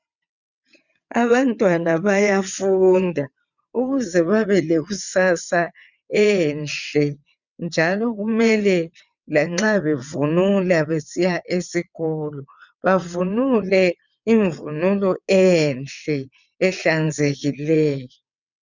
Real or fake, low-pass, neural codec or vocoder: fake; 7.2 kHz; vocoder, 22.05 kHz, 80 mel bands, WaveNeXt